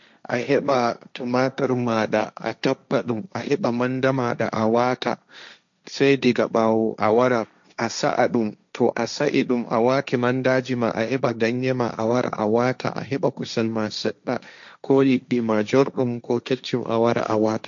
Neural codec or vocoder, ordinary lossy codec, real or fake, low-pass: codec, 16 kHz, 1.1 kbps, Voila-Tokenizer; MP3, 64 kbps; fake; 7.2 kHz